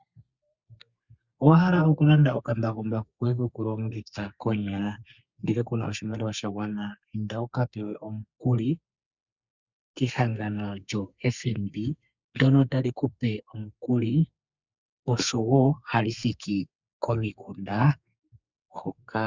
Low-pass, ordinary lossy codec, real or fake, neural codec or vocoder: 7.2 kHz; Opus, 64 kbps; fake; codec, 32 kHz, 1.9 kbps, SNAC